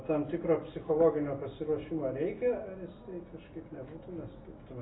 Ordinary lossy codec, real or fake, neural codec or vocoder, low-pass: AAC, 16 kbps; real; none; 7.2 kHz